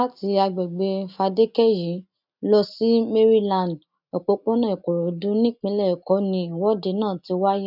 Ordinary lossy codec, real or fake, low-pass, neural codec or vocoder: none; real; 5.4 kHz; none